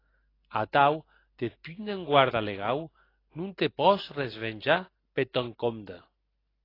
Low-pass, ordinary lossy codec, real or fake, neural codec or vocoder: 5.4 kHz; AAC, 24 kbps; real; none